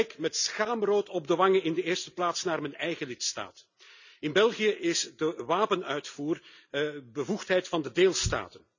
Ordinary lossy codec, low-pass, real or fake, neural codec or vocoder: none; 7.2 kHz; real; none